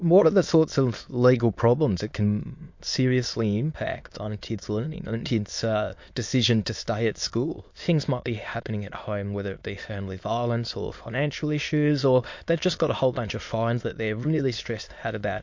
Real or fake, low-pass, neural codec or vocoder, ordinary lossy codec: fake; 7.2 kHz; autoencoder, 22.05 kHz, a latent of 192 numbers a frame, VITS, trained on many speakers; MP3, 48 kbps